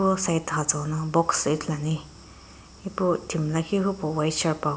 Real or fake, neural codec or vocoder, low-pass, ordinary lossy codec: real; none; none; none